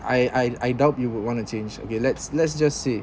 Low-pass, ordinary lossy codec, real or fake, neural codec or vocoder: none; none; real; none